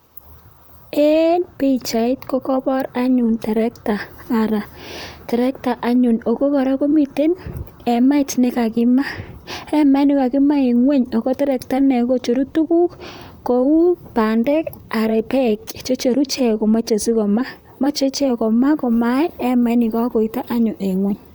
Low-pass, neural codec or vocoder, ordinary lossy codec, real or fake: none; vocoder, 44.1 kHz, 128 mel bands, Pupu-Vocoder; none; fake